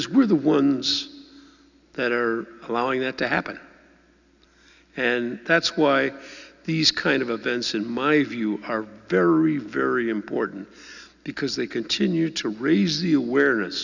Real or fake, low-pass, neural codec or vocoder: real; 7.2 kHz; none